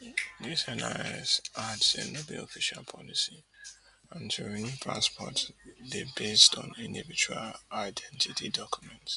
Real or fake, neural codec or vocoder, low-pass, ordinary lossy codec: real; none; 10.8 kHz; none